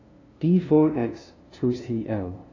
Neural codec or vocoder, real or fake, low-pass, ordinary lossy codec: codec, 16 kHz, 0.5 kbps, FunCodec, trained on LibriTTS, 25 frames a second; fake; 7.2 kHz; AAC, 32 kbps